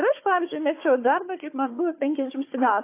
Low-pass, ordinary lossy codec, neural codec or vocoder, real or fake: 3.6 kHz; AAC, 24 kbps; codec, 16 kHz, 4 kbps, FunCodec, trained on Chinese and English, 50 frames a second; fake